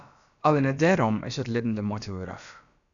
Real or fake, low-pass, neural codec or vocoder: fake; 7.2 kHz; codec, 16 kHz, about 1 kbps, DyCAST, with the encoder's durations